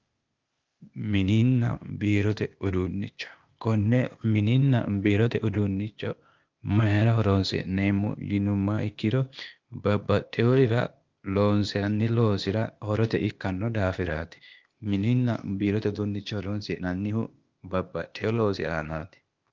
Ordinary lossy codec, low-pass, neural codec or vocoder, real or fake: Opus, 24 kbps; 7.2 kHz; codec, 16 kHz, 0.8 kbps, ZipCodec; fake